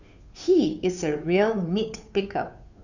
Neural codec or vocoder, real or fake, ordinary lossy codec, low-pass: codec, 16 kHz, 2 kbps, FunCodec, trained on Chinese and English, 25 frames a second; fake; none; 7.2 kHz